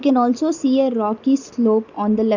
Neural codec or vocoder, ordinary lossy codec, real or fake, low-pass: none; none; real; 7.2 kHz